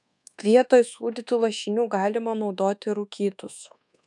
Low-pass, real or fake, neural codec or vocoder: 10.8 kHz; fake; codec, 24 kHz, 1.2 kbps, DualCodec